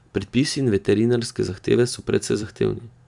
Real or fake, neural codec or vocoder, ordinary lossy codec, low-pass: fake; vocoder, 44.1 kHz, 128 mel bands every 512 samples, BigVGAN v2; none; 10.8 kHz